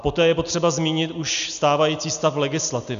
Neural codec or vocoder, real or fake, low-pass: none; real; 7.2 kHz